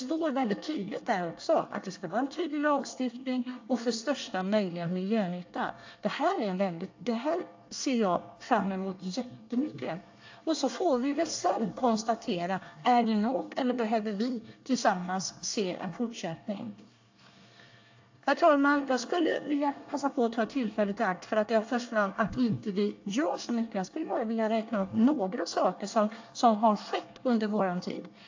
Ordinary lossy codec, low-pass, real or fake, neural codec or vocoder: AAC, 48 kbps; 7.2 kHz; fake; codec, 24 kHz, 1 kbps, SNAC